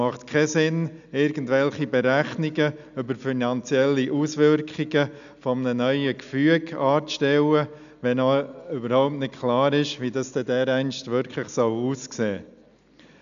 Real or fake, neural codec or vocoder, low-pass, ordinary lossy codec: real; none; 7.2 kHz; none